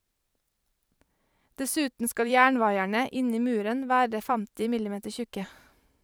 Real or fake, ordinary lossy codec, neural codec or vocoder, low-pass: fake; none; vocoder, 44.1 kHz, 128 mel bands every 512 samples, BigVGAN v2; none